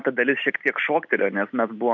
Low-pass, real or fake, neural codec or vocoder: 7.2 kHz; real; none